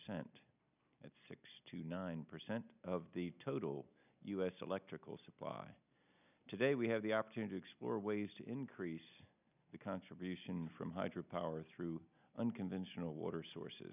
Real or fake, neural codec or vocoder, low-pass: real; none; 3.6 kHz